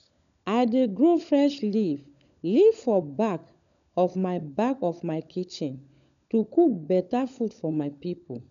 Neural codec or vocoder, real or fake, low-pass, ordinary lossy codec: codec, 16 kHz, 16 kbps, FunCodec, trained on LibriTTS, 50 frames a second; fake; 7.2 kHz; none